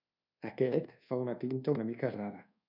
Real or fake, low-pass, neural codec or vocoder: fake; 5.4 kHz; codec, 24 kHz, 1.2 kbps, DualCodec